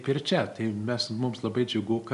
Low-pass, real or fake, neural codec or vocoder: 10.8 kHz; real; none